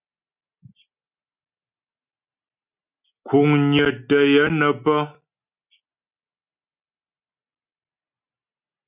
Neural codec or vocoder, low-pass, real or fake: none; 3.6 kHz; real